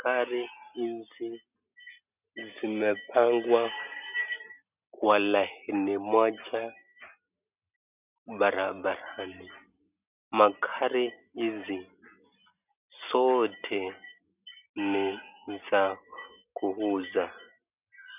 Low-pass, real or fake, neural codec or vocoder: 3.6 kHz; real; none